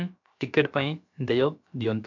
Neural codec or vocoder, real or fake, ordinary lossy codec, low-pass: codec, 16 kHz, about 1 kbps, DyCAST, with the encoder's durations; fake; none; 7.2 kHz